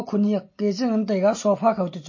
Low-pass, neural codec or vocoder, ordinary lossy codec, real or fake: 7.2 kHz; none; MP3, 32 kbps; real